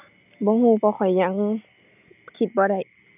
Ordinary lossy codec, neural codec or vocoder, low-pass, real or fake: none; none; 3.6 kHz; real